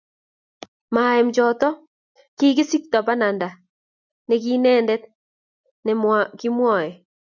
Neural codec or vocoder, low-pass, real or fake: none; 7.2 kHz; real